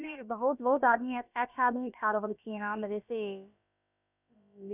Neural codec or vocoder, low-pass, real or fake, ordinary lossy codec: codec, 16 kHz, about 1 kbps, DyCAST, with the encoder's durations; 3.6 kHz; fake; none